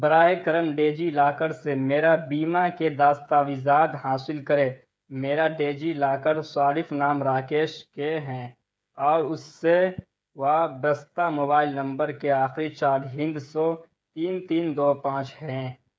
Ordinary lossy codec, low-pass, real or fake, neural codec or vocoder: none; none; fake; codec, 16 kHz, 8 kbps, FreqCodec, smaller model